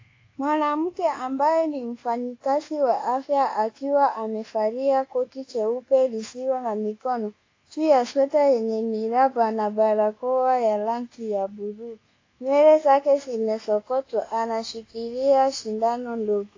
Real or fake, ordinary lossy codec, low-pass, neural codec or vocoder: fake; AAC, 32 kbps; 7.2 kHz; codec, 24 kHz, 1.2 kbps, DualCodec